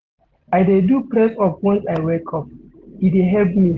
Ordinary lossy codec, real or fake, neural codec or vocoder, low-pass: Opus, 16 kbps; real; none; 7.2 kHz